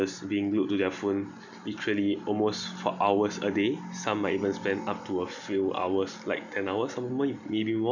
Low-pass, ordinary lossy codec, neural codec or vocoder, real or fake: 7.2 kHz; none; none; real